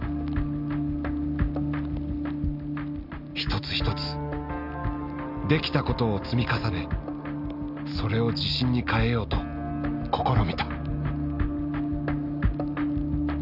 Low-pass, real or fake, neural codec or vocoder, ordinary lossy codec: 5.4 kHz; real; none; none